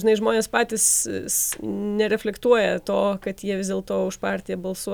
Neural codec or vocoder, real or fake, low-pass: none; real; 19.8 kHz